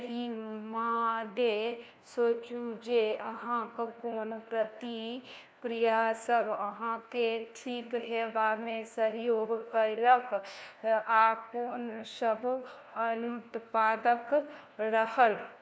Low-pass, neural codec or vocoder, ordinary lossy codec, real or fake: none; codec, 16 kHz, 1 kbps, FunCodec, trained on LibriTTS, 50 frames a second; none; fake